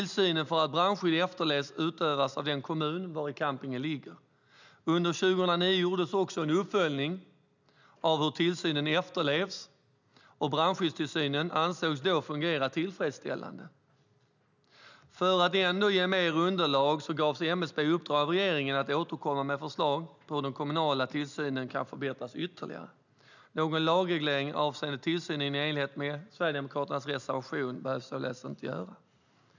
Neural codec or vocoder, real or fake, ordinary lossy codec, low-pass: none; real; none; 7.2 kHz